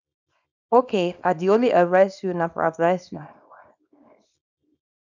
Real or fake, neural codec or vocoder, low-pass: fake; codec, 24 kHz, 0.9 kbps, WavTokenizer, small release; 7.2 kHz